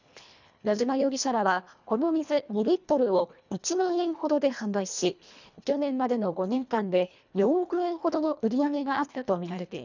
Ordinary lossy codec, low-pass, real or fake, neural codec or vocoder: none; 7.2 kHz; fake; codec, 24 kHz, 1.5 kbps, HILCodec